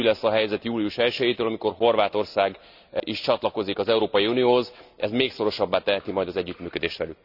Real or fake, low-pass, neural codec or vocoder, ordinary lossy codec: real; 5.4 kHz; none; none